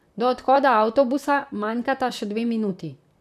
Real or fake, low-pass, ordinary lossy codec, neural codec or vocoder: fake; 14.4 kHz; none; vocoder, 44.1 kHz, 128 mel bands, Pupu-Vocoder